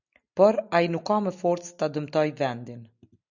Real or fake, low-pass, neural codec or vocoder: real; 7.2 kHz; none